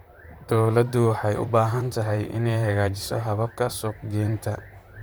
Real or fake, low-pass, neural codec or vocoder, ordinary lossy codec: fake; none; vocoder, 44.1 kHz, 128 mel bands, Pupu-Vocoder; none